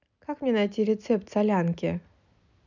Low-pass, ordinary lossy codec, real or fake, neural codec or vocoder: 7.2 kHz; none; real; none